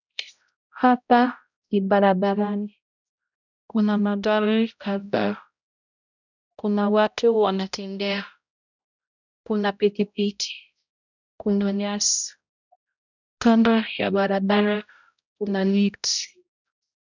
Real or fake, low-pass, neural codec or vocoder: fake; 7.2 kHz; codec, 16 kHz, 0.5 kbps, X-Codec, HuBERT features, trained on balanced general audio